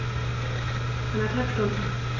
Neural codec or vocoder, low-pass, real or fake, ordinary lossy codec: none; 7.2 kHz; real; AAC, 32 kbps